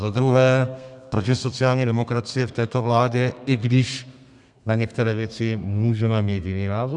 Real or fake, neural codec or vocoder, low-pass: fake; codec, 32 kHz, 1.9 kbps, SNAC; 10.8 kHz